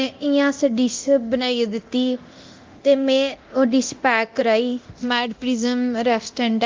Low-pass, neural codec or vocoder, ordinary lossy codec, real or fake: 7.2 kHz; codec, 24 kHz, 0.9 kbps, DualCodec; Opus, 32 kbps; fake